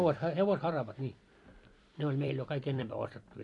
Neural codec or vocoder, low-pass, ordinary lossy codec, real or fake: vocoder, 44.1 kHz, 128 mel bands every 256 samples, BigVGAN v2; 10.8 kHz; AAC, 48 kbps; fake